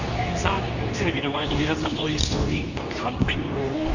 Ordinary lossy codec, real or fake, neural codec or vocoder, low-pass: none; fake; codec, 24 kHz, 0.9 kbps, WavTokenizer, medium speech release version 2; 7.2 kHz